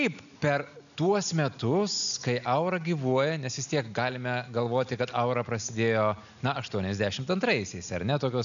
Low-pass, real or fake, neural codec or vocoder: 7.2 kHz; real; none